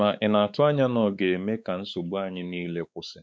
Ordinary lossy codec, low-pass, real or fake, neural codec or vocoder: none; none; fake; codec, 16 kHz, 4 kbps, X-Codec, WavLM features, trained on Multilingual LibriSpeech